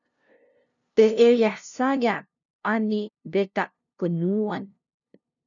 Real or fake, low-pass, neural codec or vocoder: fake; 7.2 kHz; codec, 16 kHz, 0.5 kbps, FunCodec, trained on LibriTTS, 25 frames a second